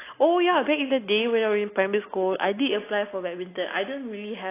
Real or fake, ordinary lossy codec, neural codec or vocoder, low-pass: real; AAC, 24 kbps; none; 3.6 kHz